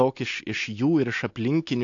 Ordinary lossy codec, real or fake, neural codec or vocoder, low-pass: AAC, 48 kbps; real; none; 7.2 kHz